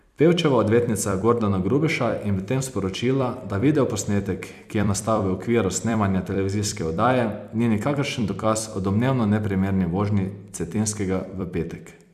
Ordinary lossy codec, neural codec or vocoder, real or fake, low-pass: none; vocoder, 44.1 kHz, 128 mel bands every 256 samples, BigVGAN v2; fake; 14.4 kHz